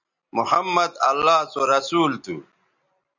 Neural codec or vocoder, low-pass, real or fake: none; 7.2 kHz; real